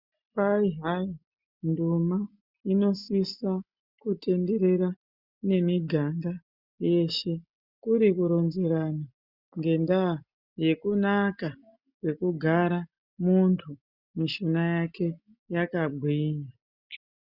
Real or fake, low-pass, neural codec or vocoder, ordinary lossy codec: real; 5.4 kHz; none; Opus, 64 kbps